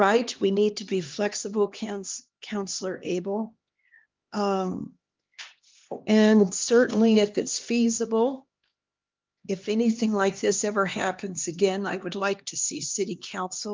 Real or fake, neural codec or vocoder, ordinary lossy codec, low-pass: fake; codec, 16 kHz, 2 kbps, X-Codec, HuBERT features, trained on LibriSpeech; Opus, 32 kbps; 7.2 kHz